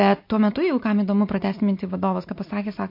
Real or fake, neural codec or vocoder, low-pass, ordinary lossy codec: real; none; 5.4 kHz; MP3, 32 kbps